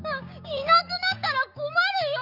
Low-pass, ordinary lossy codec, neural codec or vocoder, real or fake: 5.4 kHz; none; codec, 16 kHz, 6 kbps, DAC; fake